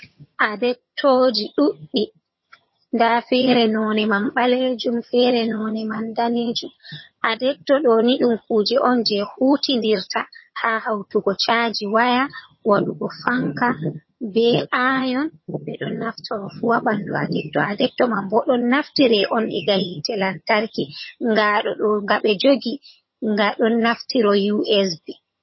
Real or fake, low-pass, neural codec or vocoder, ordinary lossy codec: fake; 7.2 kHz; vocoder, 22.05 kHz, 80 mel bands, HiFi-GAN; MP3, 24 kbps